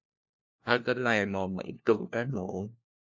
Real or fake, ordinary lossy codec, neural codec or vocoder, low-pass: fake; MP3, 64 kbps; codec, 16 kHz, 1 kbps, FunCodec, trained on LibriTTS, 50 frames a second; 7.2 kHz